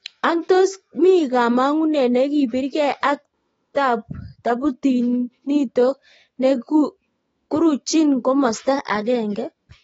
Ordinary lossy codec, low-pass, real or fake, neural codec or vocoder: AAC, 24 kbps; 19.8 kHz; fake; autoencoder, 48 kHz, 128 numbers a frame, DAC-VAE, trained on Japanese speech